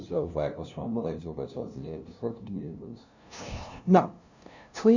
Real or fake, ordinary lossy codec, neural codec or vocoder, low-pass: fake; none; codec, 16 kHz, 0.5 kbps, FunCodec, trained on LibriTTS, 25 frames a second; 7.2 kHz